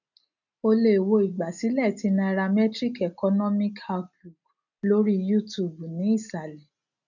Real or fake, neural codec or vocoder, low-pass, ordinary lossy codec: real; none; 7.2 kHz; none